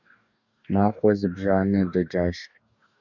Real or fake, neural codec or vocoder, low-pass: fake; codec, 44.1 kHz, 2.6 kbps, DAC; 7.2 kHz